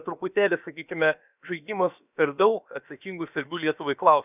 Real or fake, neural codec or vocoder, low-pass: fake; codec, 16 kHz, about 1 kbps, DyCAST, with the encoder's durations; 3.6 kHz